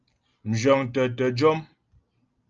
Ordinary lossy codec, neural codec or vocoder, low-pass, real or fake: Opus, 24 kbps; none; 7.2 kHz; real